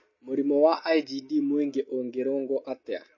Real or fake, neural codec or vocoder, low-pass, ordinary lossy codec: real; none; 7.2 kHz; MP3, 32 kbps